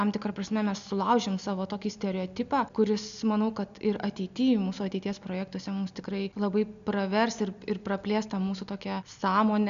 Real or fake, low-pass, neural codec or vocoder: real; 7.2 kHz; none